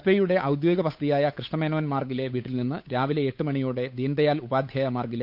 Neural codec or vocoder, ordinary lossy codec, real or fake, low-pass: codec, 16 kHz, 8 kbps, FunCodec, trained on Chinese and English, 25 frames a second; none; fake; 5.4 kHz